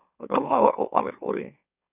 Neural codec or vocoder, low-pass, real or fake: autoencoder, 44.1 kHz, a latent of 192 numbers a frame, MeloTTS; 3.6 kHz; fake